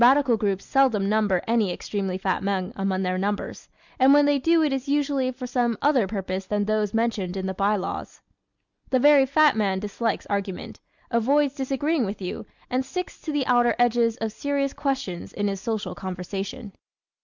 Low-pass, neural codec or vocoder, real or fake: 7.2 kHz; none; real